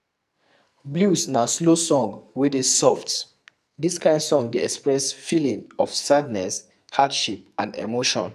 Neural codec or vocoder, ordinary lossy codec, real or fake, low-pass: codec, 44.1 kHz, 2.6 kbps, SNAC; none; fake; 14.4 kHz